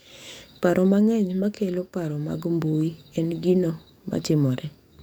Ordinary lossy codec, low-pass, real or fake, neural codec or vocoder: none; 19.8 kHz; fake; codec, 44.1 kHz, 7.8 kbps, DAC